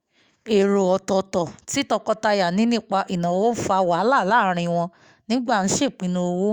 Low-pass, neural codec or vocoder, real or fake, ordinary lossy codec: 19.8 kHz; autoencoder, 48 kHz, 128 numbers a frame, DAC-VAE, trained on Japanese speech; fake; Opus, 64 kbps